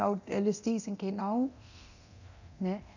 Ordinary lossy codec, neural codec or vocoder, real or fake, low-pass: none; codec, 24 kHz, 0.9 kbps, DualCodec; fake; 7.2 kHz